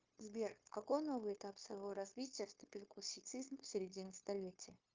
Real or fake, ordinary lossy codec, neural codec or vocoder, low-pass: fake; Opus, 24 kbps; codec, 16 kHz, 0.9 kbps, LongCat-Audio-Codec; 7.2 kHz